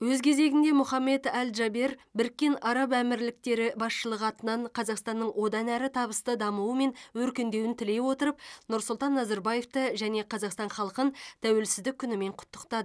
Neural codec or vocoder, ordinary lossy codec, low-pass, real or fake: none; none; none; real